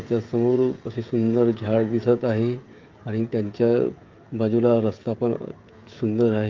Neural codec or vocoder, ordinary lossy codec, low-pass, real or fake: codec, 16 kHz, 16 kbps, FreqCodec, smaller model; Opus, 32 kbps; 7.2 kHz; fake